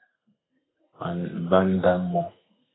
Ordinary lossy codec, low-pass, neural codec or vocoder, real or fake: AAC, 16 kbps; 7.2 kHz; codec, 32 kHz, 1.9 kbps, SNAC; fake